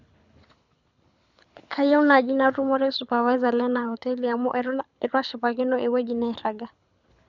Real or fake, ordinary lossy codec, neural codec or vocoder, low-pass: fake; none; codec, 24 kHz, 6 kbps, HILCodec; 7.2 kHz